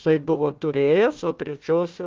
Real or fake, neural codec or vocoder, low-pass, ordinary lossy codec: fake; codec, 16 kHz, 1 kbps, FunCodec, trained on Chinese and English, 50 frames a second; 7.2 kHz; Opus, 24 kbps